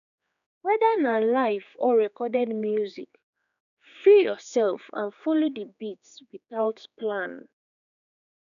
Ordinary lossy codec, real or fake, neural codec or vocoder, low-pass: none; fake; codec, 16 kHz, 4 kbps, X-Codec, HuBERT features, trained on general audio; 7.2 kHz